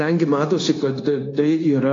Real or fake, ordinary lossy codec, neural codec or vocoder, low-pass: fake; AAC, 32 kbps; codec, 16 kHz, 0.9 kbps, LongCat-Audio-Codec; 7.2 kHz